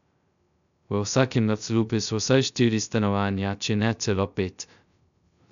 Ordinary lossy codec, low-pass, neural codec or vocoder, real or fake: none; 7.2 kHz; codec, 16 kHz, 0.2 kbps, FocalCodec; fake